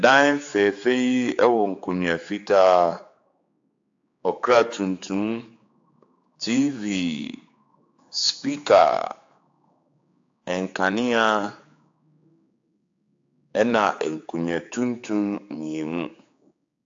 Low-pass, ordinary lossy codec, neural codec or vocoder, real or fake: 7.2 kHz; AAC, 48 kbps; codec, 16 kHz, 4 kbps, X-Codec, HuBERT features, trained on general audio; fake